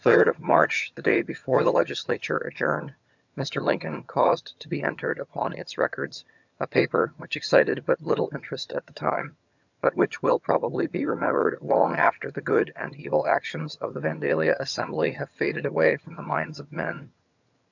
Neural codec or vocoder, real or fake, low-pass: vocoder, 22.05 kHz, 80 mel bands, HiFi-GAN; fake; 7.2 kHz